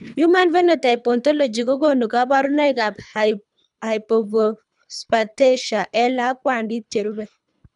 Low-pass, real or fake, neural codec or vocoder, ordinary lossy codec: 10.8 kHz; fake; codec, 24 kHz, 3 kbps, HILCodec; none